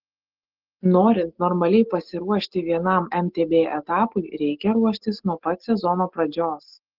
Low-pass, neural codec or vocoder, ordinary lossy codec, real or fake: 5.4 kHz; none; Opus, 16 kbps; real